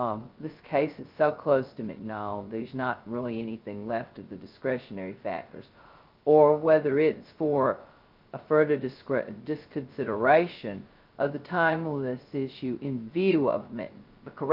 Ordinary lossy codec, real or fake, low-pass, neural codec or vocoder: Opus, 24 kbps; fake; 5.4 kHz; codec, 16 kHz, 0.2 kbps, FocalCodec